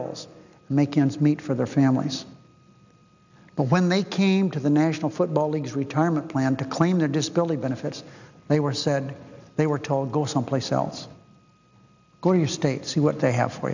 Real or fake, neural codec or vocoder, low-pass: real; none; 7.2 kHz